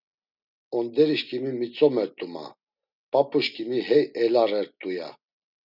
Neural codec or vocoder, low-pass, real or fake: none; 5.4 kHz; real